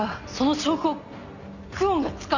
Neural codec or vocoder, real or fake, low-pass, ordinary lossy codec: none; real; 7.2 kHz; none